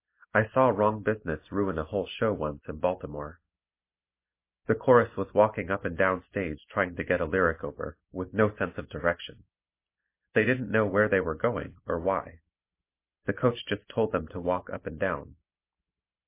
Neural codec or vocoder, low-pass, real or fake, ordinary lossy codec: none; 3.6 kHz; real; MP3, 24 kbps